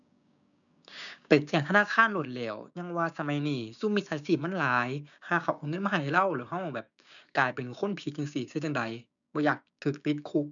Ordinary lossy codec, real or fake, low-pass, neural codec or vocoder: none; fake; 7.2 kHz; codec, 16 kHz, 6 kbps, DAC